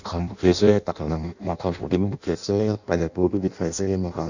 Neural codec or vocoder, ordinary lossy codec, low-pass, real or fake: codec, 16 kHz in and 24 kHz out, 0.6 kbps, FireRedTTS-2 codec; none; 7.2 kHz; fake